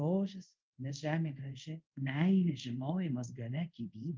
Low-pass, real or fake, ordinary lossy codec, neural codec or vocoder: 7.2 kHz; fake; Opus, 32 kbps; codec, 24 kHz, 0.5 kbps, DualCodec